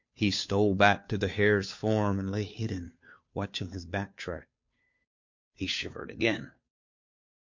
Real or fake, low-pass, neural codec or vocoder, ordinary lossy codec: fake; 7.2 kHz; codec, 16 kHz, 2 kbps, FunCodec, trained on Chinese and English, 25 frames a second; MP3, 48 kbps